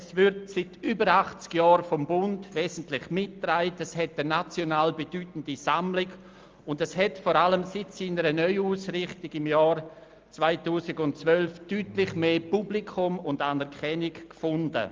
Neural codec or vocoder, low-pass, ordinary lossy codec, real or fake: none; 7.2 kHz; Opus, 24 kbps; real